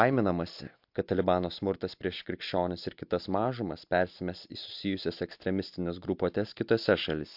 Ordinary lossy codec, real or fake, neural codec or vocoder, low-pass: MP3, 48 kbps; real; none; 5.4 kHz